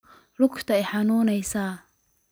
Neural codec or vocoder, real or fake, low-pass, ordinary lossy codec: none; real; none; none